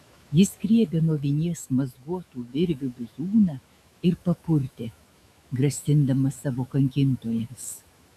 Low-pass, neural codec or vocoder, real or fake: 14.4 kHz; autoencoder, 48 kHz, 128 numbers a frame, DAC-VAE, trained on Japanese speech; fake